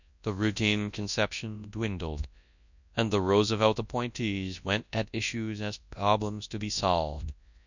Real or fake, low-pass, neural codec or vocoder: fake; 7.2 kHz; codec, 24 kHz, 0.9 kbps, WavTokenizer, large speech release